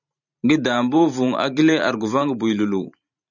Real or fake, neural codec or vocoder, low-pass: fake; vocoder, 44.1 kHz, 128 mel bands every 512 samples, BigVGAN v2; 7.2 kHz